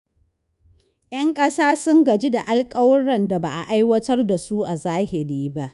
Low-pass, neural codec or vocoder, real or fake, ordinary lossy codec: 10.8 kHz; codec, 24 kHz, 1.2 kbps, DualCodec; fake; none